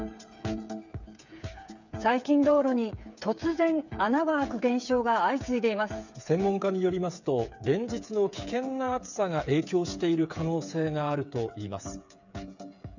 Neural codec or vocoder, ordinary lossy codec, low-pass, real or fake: codec, 16 kHz, 8 kbps, FreqCodec, smaller model; none; 7.2 kHz; fake